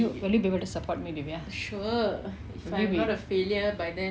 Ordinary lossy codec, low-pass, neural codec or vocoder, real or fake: none; none; none; real